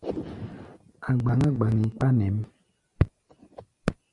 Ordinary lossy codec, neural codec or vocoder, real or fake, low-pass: AAC, 64 kbps; none; real; 10.8 kHz